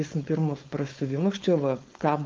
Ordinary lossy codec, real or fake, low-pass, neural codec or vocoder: Opus, 32 kbps; fake; 7.2 kHz; codec, 16 kHz, 4.8 kbps, FACodec